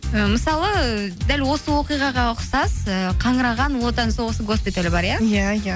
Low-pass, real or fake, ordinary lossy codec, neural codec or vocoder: none; real; none; none